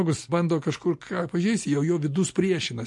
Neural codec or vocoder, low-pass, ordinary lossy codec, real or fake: none; 10.8 kHz; MP3, 48 kbps; real